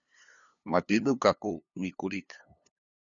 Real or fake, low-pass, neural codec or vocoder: fake; 7.2 kHz; codec, 16 kHz, 2 kbps, FunCodec, trained on LibriTTS, 25 frames a second